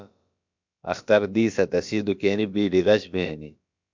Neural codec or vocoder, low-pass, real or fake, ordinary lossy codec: codec, 16 kHz, about 1 kbps, DyCAST, with the encoder's durations; 7.2 kHz; fake; MP3, 64 kbps